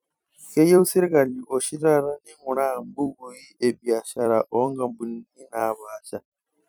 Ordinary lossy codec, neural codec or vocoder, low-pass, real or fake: none; vocoder, 44.1 kHz, 128 mel bands every 256 samples, BigVGAN v2; none; fake